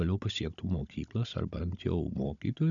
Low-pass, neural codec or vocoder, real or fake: 7.2 kHz; codec, 16 kHz, 16 kbps, FunCodec, trained on Chinese and English, 50 frames a second; fake